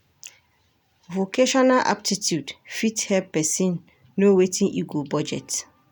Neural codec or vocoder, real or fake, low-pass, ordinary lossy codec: none; real; none; none